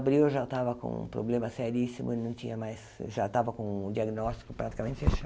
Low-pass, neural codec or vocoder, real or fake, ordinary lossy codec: none; none; real; none